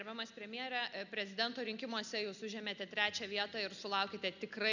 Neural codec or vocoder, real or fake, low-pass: none; real; 7.2 kHz